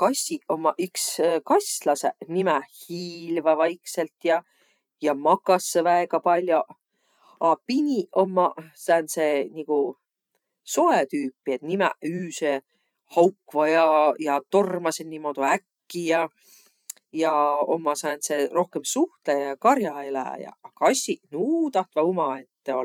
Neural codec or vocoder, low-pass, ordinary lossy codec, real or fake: vocoder, 44.1 kHz, 128 mel bands every 512 samples, BigVGAN v2; 19.8 kHz; none; fake